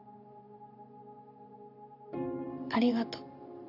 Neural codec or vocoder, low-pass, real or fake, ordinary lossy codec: vocoder, 44.1 kHz, 128 mel bands every 512 samples, BigVGAN v2; 5.4 kHz; fake; none